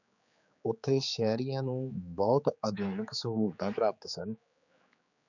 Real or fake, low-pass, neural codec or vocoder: fake; 7.2 kHz; codec, 16 kHz, 4 kbps, X-Codec, HuBERT features, trained on general audio